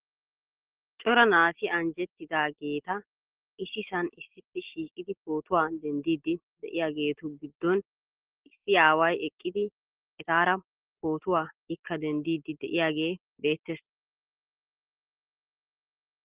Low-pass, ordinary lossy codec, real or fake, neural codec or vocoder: 3.6 kHz; Opus, 16 kbps; real; none